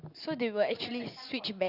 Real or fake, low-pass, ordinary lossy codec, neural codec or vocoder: real; 5.4 kHz; Opus, 64 kbps; none